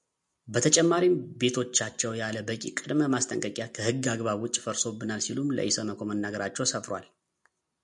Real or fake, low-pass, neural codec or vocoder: real; 10.8 kHz; none